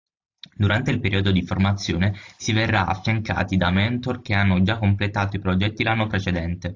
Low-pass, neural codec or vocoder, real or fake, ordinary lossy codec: 7.2 kHz; none; real; AAC, 48 kbps